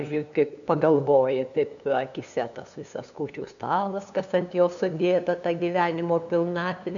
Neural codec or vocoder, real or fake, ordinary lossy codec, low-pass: codec, 16 kHz, 2 kbps, FunCodec, trained on LibriTTS, 25 frames a second; fake; MP3, 96 kbps; 7.2 kHz